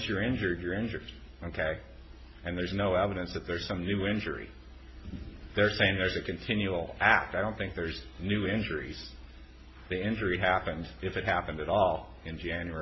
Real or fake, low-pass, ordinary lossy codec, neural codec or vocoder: real; 7.2 kHz; MP3, 24 kbps; none